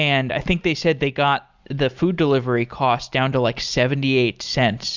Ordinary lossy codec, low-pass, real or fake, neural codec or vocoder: Opus, 64 kbps; 7.2 kHz; real; none